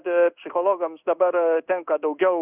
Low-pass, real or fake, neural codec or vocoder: 3.6 kHz; fake; codec, 16 kHz in and 24 kHz out, 1 kbps, XY-Tokenizer